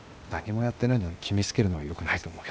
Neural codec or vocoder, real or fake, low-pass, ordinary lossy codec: codec, 16 kHz, 0.8 kbps, ZipCodec; fake; none; none